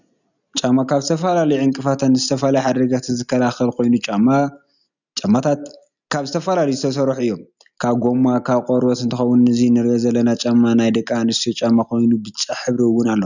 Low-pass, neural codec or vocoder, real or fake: 7.2 kHz; none; real